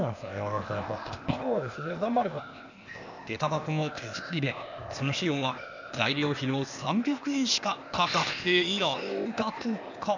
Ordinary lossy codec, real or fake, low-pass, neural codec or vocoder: none; fake; 7.2 kHz; codec, 16 kHz, 0.8 kbps, ZipCodec